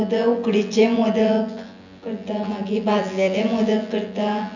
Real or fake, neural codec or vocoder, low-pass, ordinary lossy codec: fake; vocoder, 24 kHz, 100 mel bands, Vocos; 7.2 kHz; none